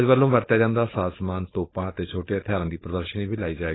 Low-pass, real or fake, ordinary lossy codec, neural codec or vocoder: 7.2 kHz; fake; AAC, 16 kbps; codec, 16 kHz, 4.8 kbps, FACodec